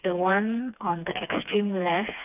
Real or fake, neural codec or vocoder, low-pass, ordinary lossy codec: fake; codec, 16 kHz, 2 kbps, FreqCodec, smaller model; 3.6 kHz; none